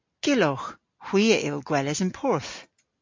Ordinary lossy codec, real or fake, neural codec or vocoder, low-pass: MP3, 48 kbps; real; none; 7.2 kHz